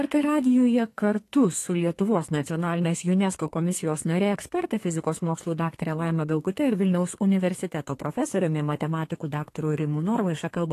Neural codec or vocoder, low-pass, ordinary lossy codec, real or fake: codec, 32 kHz, 1.9 kbps, SNAC; 14.4 kHz; AAC, 48 kbps; fake